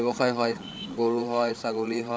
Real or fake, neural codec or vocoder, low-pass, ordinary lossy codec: fake; codec, 16 kHz, 8 kbps, FreqCodec, larger model; none; none